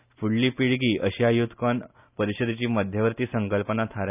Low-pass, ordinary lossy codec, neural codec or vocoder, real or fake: 3.6 kHz; none; none; real